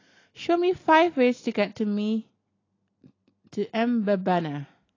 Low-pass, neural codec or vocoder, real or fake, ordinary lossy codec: 7.2 kHz; none; real; AAC, 32 kbps